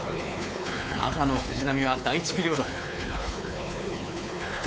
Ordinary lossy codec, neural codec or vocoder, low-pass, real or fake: none; codec, 16 kHz, 4 kbps, X-Codec, WavLM features, trained on Multilingual LibriSpeech; none; fake